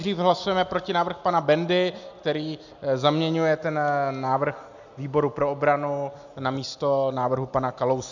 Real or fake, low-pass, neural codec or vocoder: real; 7.2 kHz; none